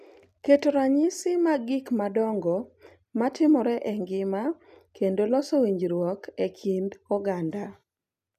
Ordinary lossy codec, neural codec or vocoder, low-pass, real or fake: none; none; 14.4 kHz; real